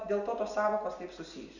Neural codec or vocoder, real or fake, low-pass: none; real; 7.2 kHz